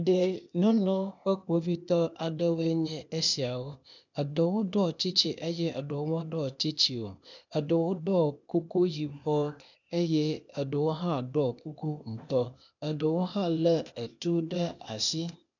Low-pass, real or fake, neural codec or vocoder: 7.2 kHz; fake; codec, 16 kHz, 0.8 kbps, ZipCodec